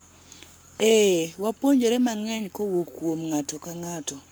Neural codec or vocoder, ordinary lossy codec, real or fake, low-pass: codec, 44.1 kHz, 7.8 kbps, Pupu-Codec; none; fake; none